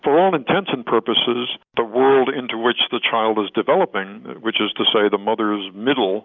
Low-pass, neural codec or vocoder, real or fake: 7.2 kHz; none; real